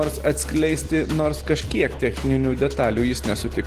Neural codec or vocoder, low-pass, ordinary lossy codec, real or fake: none; 14.4 kHz; Opus, 16 kbps; real